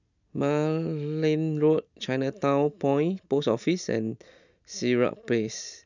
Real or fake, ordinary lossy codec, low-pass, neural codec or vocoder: real; none; 7.2 kHz; none